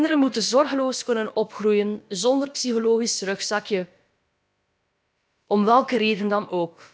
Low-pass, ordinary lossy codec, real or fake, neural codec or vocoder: none; none; fake; codec, 16 kHz, about 1 kbps, DyCAST, with the encoder's durations